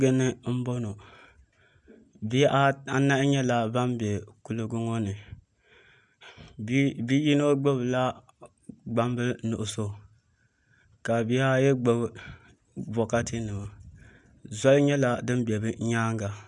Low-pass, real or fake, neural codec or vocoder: 10.8 kHz; real; none